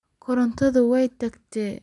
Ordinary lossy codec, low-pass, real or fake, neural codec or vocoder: none; 10.8 kHz; real; none